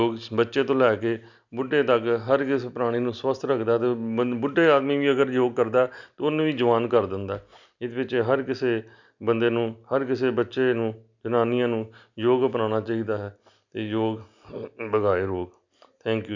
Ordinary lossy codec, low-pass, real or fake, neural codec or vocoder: none; 7.2 kHz; real; none